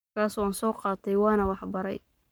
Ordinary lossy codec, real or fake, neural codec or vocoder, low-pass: none; fake; codec, 44.1 kHz, 7.8 kbps, Pupu-Codec; none